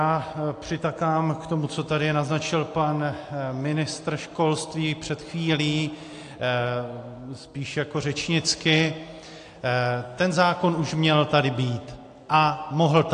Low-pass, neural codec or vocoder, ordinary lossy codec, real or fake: 9.9 kHz; none; AAC, 48 kbps; real